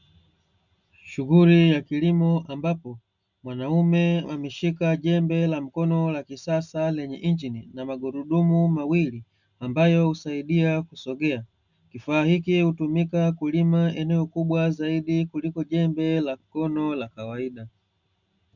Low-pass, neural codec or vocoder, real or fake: 7.2 kHz; none; real